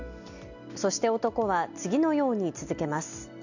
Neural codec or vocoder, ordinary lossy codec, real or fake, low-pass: none; none; real; 7.2 kHz